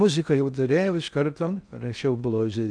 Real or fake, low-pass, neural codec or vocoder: fake; 9.9 kHz; codec, 16 kHz in and 24 kHz out, 0.6 kbps, FocalCodec, streaming, 4096 codes